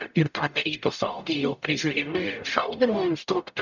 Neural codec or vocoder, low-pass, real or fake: codec, 44.1 kHz, 0.9 kbps, DAC; 7.2 kHz; fake